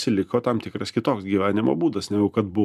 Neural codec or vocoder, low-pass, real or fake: none; 14.4 kHz; real